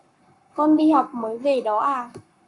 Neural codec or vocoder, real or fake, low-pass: codec, 44.1 kHz, 7.8 kbps, Pupu-Codec; fake; 10.8 kHz